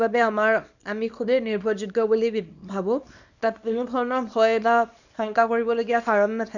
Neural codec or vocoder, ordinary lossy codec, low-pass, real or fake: codec, 24 kHz, 0.9 kbps, WavTokenizer, small release; none; 7.2 kHz; fake